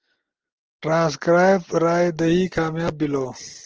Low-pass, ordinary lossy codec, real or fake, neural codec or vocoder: 7.2 kHz; Opus, 16 kbps; real; none